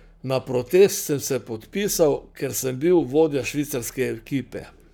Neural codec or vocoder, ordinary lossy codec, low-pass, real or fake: codec, 44.1 kHz, 7.8 kbps, Pupu-Codec; none; none; fake